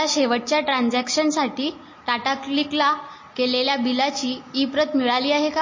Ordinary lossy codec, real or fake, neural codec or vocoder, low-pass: MP3, 32 kbps; real; none; 7.2 kHz